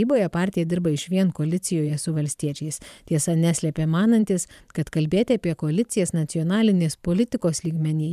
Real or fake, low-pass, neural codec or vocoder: fake; 14.4 kHz; vocoder, 44.1 kHz, 128 mel bands every 512 samples, BigVGAN v2